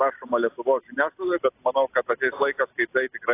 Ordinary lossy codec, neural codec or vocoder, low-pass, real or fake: AAC, 24 kbps; none; 3.6 kHz; real